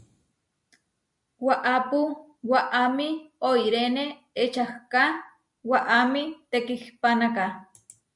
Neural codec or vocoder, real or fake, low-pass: none; real; 10.8 kHz